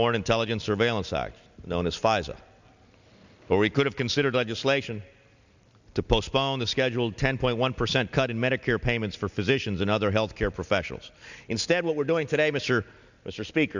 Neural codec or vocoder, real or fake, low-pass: none; real; 7.2 kHz